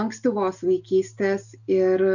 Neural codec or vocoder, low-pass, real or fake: none; 7.2 kHz; real